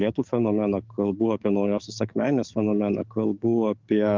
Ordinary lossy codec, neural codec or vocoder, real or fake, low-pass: Opus, 32 kbps; codec, 44.1 kHz, 7.8 kbps, DAC; fake; 7.2 kHz